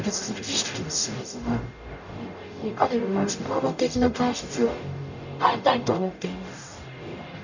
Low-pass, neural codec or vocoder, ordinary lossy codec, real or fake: 7.2 kHz; codec, 44.1 kHz, 0.9 kbps, DAC; none; fake